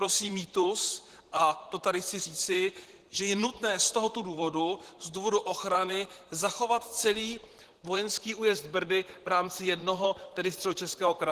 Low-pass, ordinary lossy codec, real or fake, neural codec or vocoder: 14.4 kHz; Opus, 16 kbps; fake; vocoder, 44.1 kHz, 128 mel bands, Pupu-Vocoder